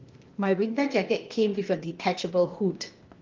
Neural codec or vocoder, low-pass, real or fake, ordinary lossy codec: codec, 16 kHz, 0.8 kbps, ZipCodec; 7.2 kHz; fake; Opus, 32 kbps